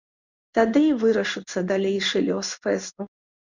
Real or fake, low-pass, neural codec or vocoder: fake; 7.2 kHz; codec, 16 kHz in and 24 kHz out, 1 kbps, XY-Tokenizer